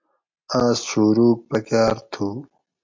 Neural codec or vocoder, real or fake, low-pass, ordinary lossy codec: none; real; 7.2 kHz; MP3, 48 kbps